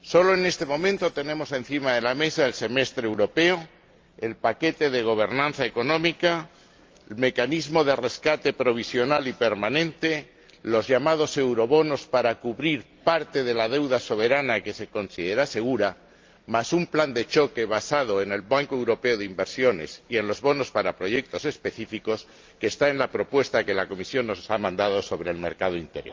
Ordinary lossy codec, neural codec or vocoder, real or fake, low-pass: Opus, 24 kbps; none; real; 7.2 kHz